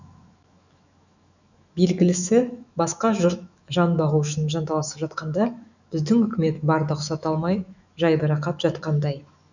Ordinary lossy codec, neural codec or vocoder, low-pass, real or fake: none; autoencoder, 48 kHz, 128 numbers a frame, DAC-VAE, trained on Japanese speech; 7.2 kHz; fake